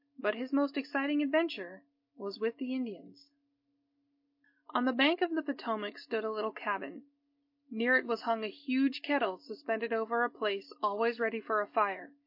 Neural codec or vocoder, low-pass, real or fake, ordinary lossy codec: none; 5.4 kHz; real; MP3, 32 kbps